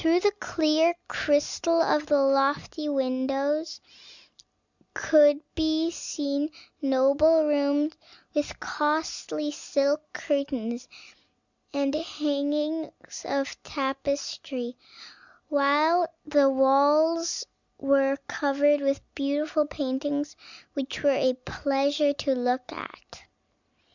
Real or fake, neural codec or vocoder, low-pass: real; none; 7.2 kHz